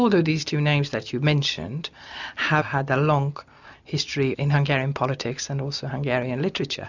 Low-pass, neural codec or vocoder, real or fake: 7.2 kHz; none; real